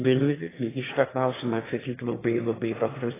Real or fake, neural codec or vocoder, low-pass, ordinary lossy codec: fake; autoencoder, 22.05 kHz, a latent of 192 numbers a frame, VITS, trained on one speaker; 3.6 kHz; AAC, 16 kbps